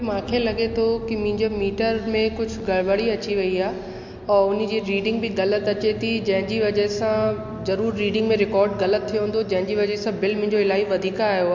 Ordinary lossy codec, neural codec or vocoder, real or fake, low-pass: MP3, 48 kbps; none; real; 7.2 kHz